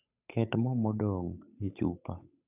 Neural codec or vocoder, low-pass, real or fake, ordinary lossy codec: codec, 16 kHz, 8 kbps, FunCodec, trained on Chinese and English, 25 frames a second; 3.6 kHz; fake; none